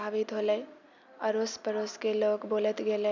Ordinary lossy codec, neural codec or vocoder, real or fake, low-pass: none; none; real; 7.2 kHz